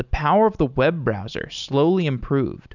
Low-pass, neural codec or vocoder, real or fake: 7.2 kHz; none; real